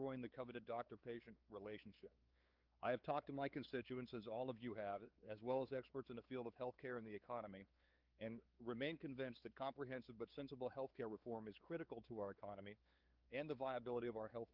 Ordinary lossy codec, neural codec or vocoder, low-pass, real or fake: Opus, 24 kbps; codec, 16 kHz, 4 kbps, X-Codec, WavLM features, trained on Multilingual LibriSpeech; 5.4 kHz; fake